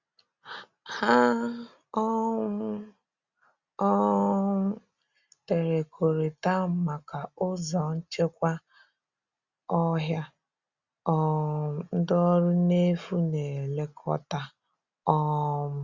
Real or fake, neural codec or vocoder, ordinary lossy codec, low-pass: real; none; Opus, 64 kbps; 7.2 kHz